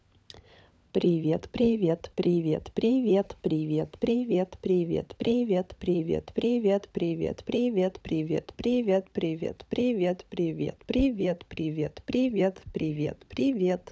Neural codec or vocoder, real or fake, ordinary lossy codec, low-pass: codec, 16 kHz, 16 kbps, FunCodec, trained on LibriTTS, 50 frames a second; fake; none; none